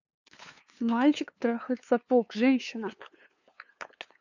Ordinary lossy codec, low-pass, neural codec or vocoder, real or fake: none; 7.2 kHz; codec, 16 kHz, 2 kbps, FunCodec, trained on LibriTTS, 25 frames a second; fake